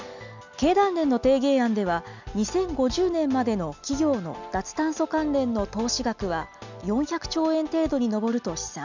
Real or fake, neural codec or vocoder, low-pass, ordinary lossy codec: real; none; 7.2 kHz; none